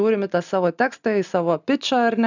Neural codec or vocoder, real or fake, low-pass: none; real; 7.2 kHz